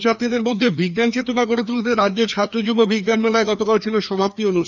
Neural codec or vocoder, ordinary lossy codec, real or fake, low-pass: codec, 16 kHz, 2 kbps, FreqCodec, larger model; none; fake; 7.2 kHz